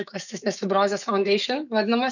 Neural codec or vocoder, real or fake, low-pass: autoencoder, 48 kHz, 128 numbers a frame, DAC-VAE, trained on Japanese speech; fake; 7.2 kHz